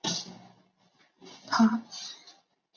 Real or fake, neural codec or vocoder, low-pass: real; none; 7.2 kHz